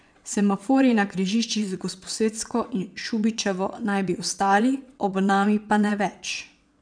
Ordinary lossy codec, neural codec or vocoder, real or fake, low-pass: none; vocoder, 22.05 kHz, 80 mel bands, WaveNeXt; fake; 9.9 kHz